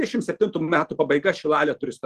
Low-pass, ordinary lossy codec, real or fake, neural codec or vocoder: 14.4 kHz; Opus, 16 kbps; real; none